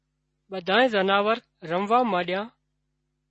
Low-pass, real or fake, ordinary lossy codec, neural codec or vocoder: 10.8 kHz; real; MP3, 32 kbps; none